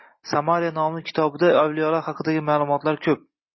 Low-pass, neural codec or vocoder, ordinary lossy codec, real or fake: 7.2 kHz; none; MP3, 24 kbps; real